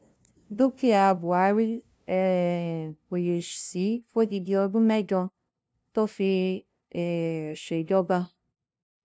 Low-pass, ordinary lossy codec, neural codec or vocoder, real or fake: none; none; codec, 16 kHz, 0.5 kbps, FunCodec, trained on LibriTTS, 25 frames a second; fake